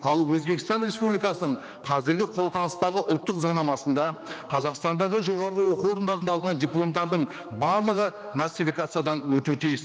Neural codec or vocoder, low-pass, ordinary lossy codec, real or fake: codec, 16 kHz, 2 kbps, X-Codec, HuBERT features, trained on general audio; none; none; fake